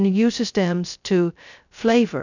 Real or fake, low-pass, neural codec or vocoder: fake; 7.2 kHz; codec, 16 kHz, 0.2 kbps, FocalCodec